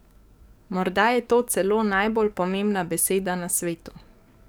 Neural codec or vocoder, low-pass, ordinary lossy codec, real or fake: codec, 44.1 kHz, 7.8 kbps, DAC; none; none; fake